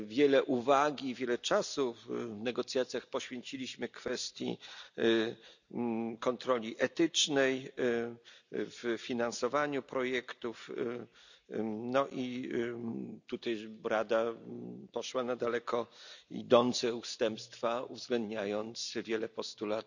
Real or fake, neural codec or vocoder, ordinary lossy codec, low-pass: real; none; none; 7.2 kHz